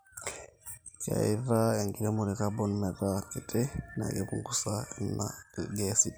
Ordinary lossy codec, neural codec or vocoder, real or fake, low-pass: none; none; real; none